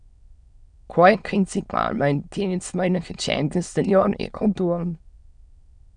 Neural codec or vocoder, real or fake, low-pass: autoencoder, 22.05 kHz, a latent of 192 numbers a frame, VITS, trained on many speakers; fake; 9.9 kHz